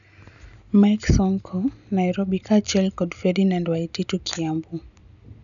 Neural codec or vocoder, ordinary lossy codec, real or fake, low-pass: none; none; real; 7.2 kHz